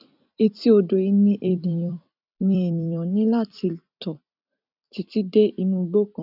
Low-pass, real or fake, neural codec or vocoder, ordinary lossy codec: 5.4 kHz; fake; vocoder, 44.1 kHz, 128 mel bands every 256 samples, BigVGAN v2; none